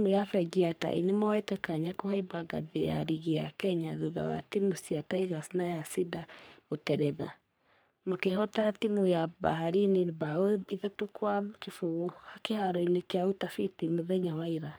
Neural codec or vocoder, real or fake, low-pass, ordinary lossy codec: codec, 44.1 kHz, 3.4 kbps, Pupu-Codec; fake; none; none